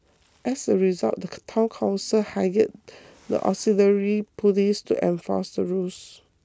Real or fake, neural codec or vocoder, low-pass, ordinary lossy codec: real; none; none; none